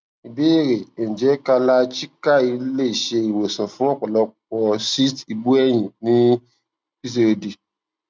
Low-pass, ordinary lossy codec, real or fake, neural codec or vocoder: none; none; real; none